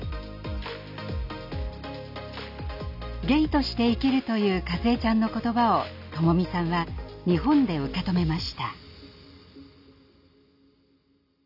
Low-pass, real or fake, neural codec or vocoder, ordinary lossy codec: 5.4 kHz; real; none; none